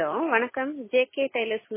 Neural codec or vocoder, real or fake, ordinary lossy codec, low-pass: none; real; MP3, 16 kbps; 3.6 kHz